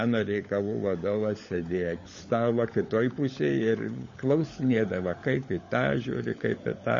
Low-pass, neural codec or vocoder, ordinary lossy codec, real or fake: 7.2 kHz; codec, 16 kHz, 16 kbps, FunCodec, trained on LibriTTS, 50 frames a second; MP3, 32 kbps; fake